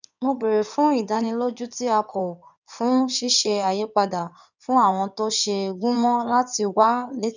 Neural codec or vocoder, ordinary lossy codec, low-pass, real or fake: codec, 16 kHz in and 24 kHz out, 2.2 kbps, FireRedTTS-2 codec; none; 7.2 kHz; fake